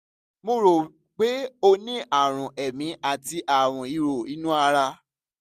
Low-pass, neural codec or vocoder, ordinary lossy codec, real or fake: 14.4 kHz; none; none; real